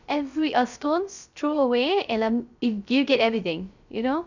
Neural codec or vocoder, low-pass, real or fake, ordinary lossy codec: codec, 16 kHz, 0.3 kbps, FocalCodec; 7.2 kHz; fake; none